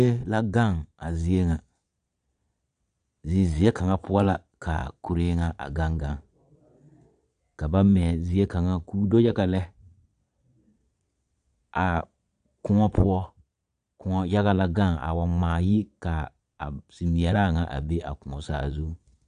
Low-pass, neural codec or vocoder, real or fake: 9.9 kHz; vocoder, 22.05 kHz, 80 mel bands, Vocos; fake